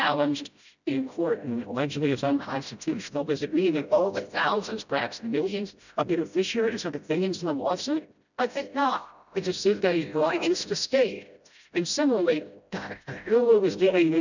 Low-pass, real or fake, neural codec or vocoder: 7.2 kHz; fake; codec, 16 kHz, 0.5 kbps, FreqCodec, smaller model